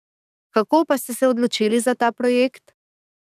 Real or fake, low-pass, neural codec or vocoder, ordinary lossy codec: fake; 14.4 kHz; codec, 44.1 kHz, 7.8 kbps, DAC; none